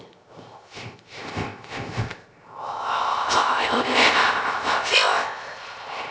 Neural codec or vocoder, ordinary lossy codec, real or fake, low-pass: codec, 16 kHz, 0.3 kbps, FocalCodec; none; fake; none